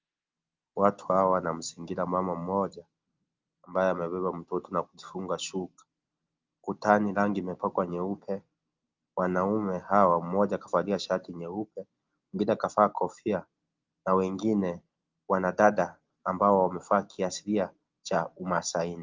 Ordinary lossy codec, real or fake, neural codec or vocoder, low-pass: Opus, 32 kbps; real; none; 7.2 kHz